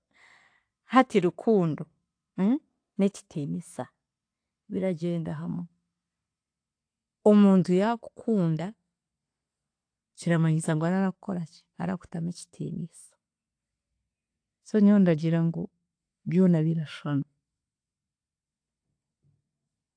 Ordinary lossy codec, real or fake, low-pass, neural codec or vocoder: AAC, 48 kbps; real; 9.9 kHz; none